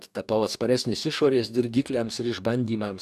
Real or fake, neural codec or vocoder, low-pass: fake; codec, 44.1 kHz, 2.6 kbps, DAC; 14.4 kHz